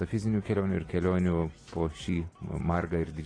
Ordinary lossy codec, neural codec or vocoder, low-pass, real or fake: AAC, 32 kbps; none; 9.9 kHz; real